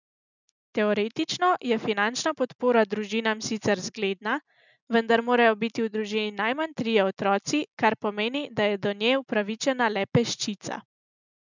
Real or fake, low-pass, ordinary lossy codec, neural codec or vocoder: real; 7.2 kHz; none; none